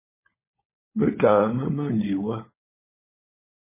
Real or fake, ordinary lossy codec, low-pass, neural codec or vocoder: fake; MP3, 16 kbps; 3.6 kHz; codec, 16 kHz, 16 kbps, FunCodec, trained on LibriTTS, 50 frames a second